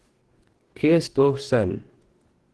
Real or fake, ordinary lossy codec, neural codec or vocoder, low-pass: fake; Opus, 16 kbps; codec, 44.1 kHz, 2.6 kbps, DAC; 10.8 kHz